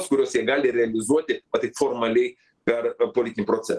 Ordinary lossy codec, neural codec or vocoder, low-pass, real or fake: Opus, 16 kbps; none; 10.8 kHz; real